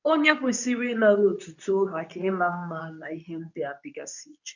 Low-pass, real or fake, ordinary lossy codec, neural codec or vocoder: 7.2 kHz; fake; none; codec, 24 kHz, 0.9 kbps, WavTokenizer, medium speech release version 2